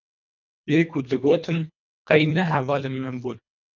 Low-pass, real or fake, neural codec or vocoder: 7.2 kHz; fake; codec, 24 kHz, 1.5 kbps, HILCodec